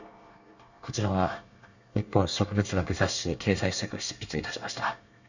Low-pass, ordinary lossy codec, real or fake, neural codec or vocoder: 7.2 kHz; none; fake; codec, 24 kHz, 1 kbps, SNAC